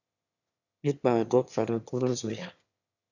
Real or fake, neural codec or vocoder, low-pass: fake; autoencoder, 22.05 kHz, a latent of 192 numbers a frame, VITS, trained on one speaker; 7.2 kHz